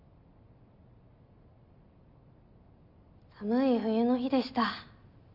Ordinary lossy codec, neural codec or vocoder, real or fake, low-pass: none; none; real; 5.4 kHz